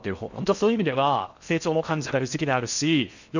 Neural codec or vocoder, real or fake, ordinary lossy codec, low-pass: codec, 16 kHz in and 24 kHz out, 0.6 kbps, FocalCodec, streaming, 4096 codes; fake; none; 7.2 kHz